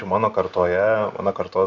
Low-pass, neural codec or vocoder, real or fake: 7.2 kHz; none; real